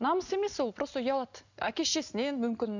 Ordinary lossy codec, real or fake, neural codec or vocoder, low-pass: none; real; none; 7.2 kHz